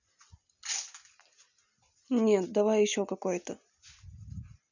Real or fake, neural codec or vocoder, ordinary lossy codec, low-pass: real; none; none; 7.2 kHz